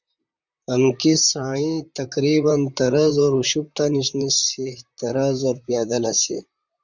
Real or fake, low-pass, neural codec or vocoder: fake; 7.2 kHz; vocoder, 44.1 kHz, 128 mel bands, Pupu-Vocoder